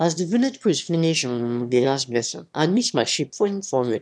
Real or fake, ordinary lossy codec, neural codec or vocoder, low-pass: fake; none; autoencoder, 22.05 kHz, a latent of 192 numbers a frame, VITS, trained on one speaker; none